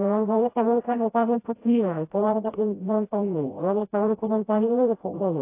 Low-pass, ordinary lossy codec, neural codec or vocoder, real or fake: 3.6 kHz; AAC, 24 kbps; codec, 16 kHz, 0.5 kbps, FreqCodec, smaller model; fake